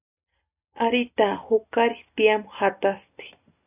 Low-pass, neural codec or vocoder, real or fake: 3.6 kHz; none; real